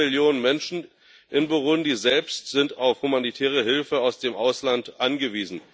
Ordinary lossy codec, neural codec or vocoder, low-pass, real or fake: none; none; none; real